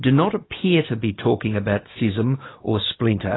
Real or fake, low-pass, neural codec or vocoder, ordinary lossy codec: fake; 7.2 kHz; codec, 16 kHz, 6 kbps, DAC; AAC, 16 kbps